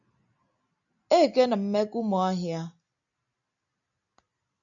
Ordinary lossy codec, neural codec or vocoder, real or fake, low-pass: AAC, 64 kbps; none; real; 7.2 kHz